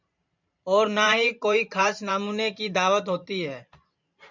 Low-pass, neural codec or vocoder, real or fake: 7.2 kHz; vocoder, 44.1 kHz, 128 mel bands every 512 samples, BigVGAN v2; fake